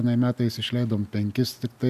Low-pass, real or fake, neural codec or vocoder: 14.4 kHz; real; none